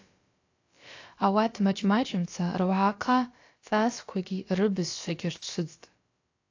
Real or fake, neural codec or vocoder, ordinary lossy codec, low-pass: fake; codec, 16 kHz, about 1 kbps, DyCAST, with the encoder's durations; AAC, 48 kbps; 7.2 kHz